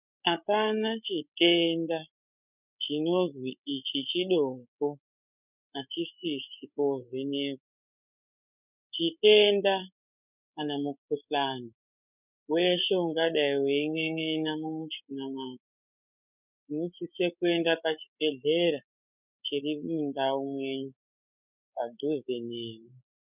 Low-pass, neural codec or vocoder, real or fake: 3.6 kHz; codec, 16 kHz, 8 kbps, FreqCodec, larger model; fake